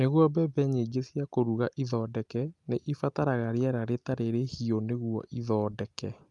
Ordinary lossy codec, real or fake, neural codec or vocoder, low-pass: Opus, 32 kbps; real; none; 10.8 kHz